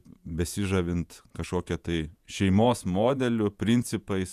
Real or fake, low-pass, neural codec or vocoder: fake; 14.4 kHz; vocoder, 48 kHz, 128 mel bands, Vocos